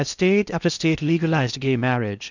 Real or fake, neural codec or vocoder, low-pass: fake; codec, 16 kHz in and 24 kHz out, 0.8 kbps, FocalCodec, streaming, 65536 codes; 7.2 kHz